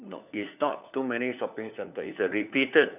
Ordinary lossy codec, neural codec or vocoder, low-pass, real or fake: none; codec, 16 kHz, 4 kbps, FunCodec, trained on LibriTTS, 50 frames a second; 3.6 kHz; fake